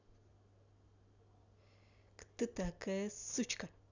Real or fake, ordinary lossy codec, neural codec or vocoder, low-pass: real; none; none; 7.2 kHz